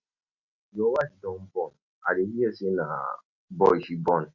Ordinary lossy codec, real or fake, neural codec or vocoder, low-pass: none; real; none; 7.2 kHz